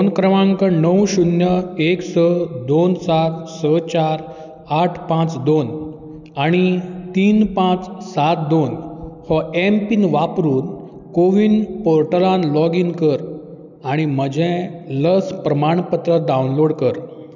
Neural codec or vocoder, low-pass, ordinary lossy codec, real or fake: none; 7.2 kHz; none; real